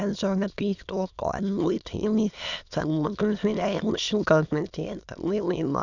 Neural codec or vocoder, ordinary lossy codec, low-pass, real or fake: autoencoder, 22.05 kHz, a latent of 192 numbers a frame, VITS, trained on many speakers; none; 7.2 kHz; fake